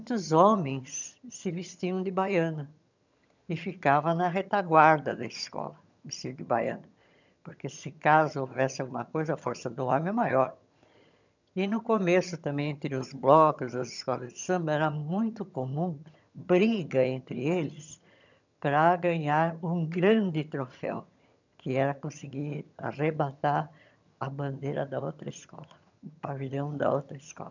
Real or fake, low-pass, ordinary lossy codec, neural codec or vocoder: fake; 7.2 kHz; none; vocoder, 22.05 kHz, 80 mel bands, HiFi-GAN